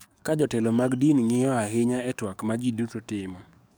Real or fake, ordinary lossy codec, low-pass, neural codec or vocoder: fake; none; none; codec, 44.1 kHz, 7.8 kbps, DAC